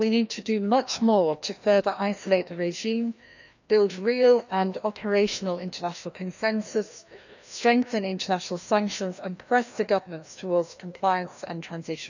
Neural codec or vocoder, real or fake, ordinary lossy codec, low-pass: codec, 16 kHz, 1 kbps, FreqCodec, larger model; fake; none; 7.2 kHz